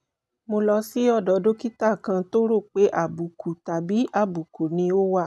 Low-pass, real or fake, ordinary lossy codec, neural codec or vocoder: none; real; none; none